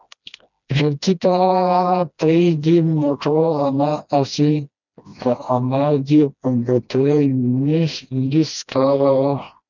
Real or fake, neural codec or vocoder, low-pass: fake; codec, 16 kHz, 1 kbps, FreqCodec, smaller model; 7.2 kHz